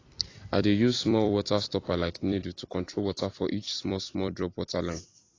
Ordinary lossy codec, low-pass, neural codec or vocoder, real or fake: AAC, 32 kbps; 7.2 kHz; vocoder, 44.1 kHz, 128 mel bands every 256 samples, BigVGAN v2; fake